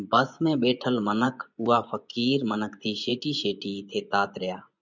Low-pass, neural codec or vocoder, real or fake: 7.2 kHz; vocoder, 24 kHz, 100 mel bands, Vocos; fake